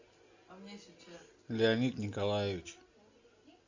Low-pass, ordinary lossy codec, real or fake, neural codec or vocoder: 7.2 kHz; Opus, 64 kbps; real; none